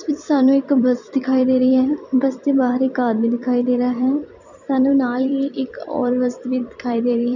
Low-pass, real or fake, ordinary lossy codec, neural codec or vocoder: 7.2 kHz; fake; none; vocoder, 44.1 kHz, 128 mel bands every 256 samples, BigVGAN v2